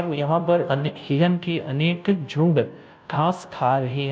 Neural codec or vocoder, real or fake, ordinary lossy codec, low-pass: codec, 16 kHz, 0.5 kbps, FunCodec, trained on Chinese and English, 25 frames a second; fake; none; none